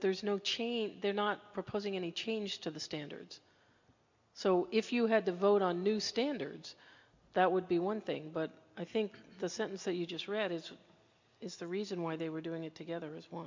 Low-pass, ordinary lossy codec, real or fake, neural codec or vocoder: 7.2 kHz; MP3, 64 kbps; real; none